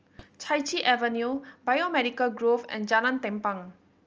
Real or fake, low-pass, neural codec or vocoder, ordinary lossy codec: real; 7.2 kHz; none; Opus, 24 kbps